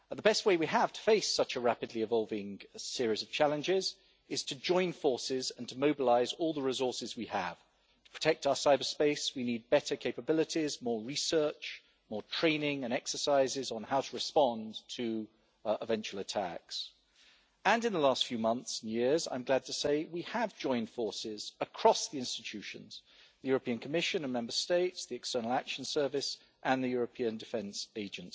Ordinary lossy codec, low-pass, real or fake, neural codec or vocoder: none; none; real; none